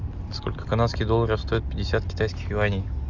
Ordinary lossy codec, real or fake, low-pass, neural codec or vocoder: Opus, 64 kbps; real; 7.2 kHz; none